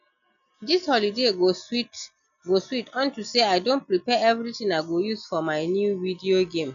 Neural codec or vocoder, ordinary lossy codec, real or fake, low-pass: none; none; real; 7.2 kHz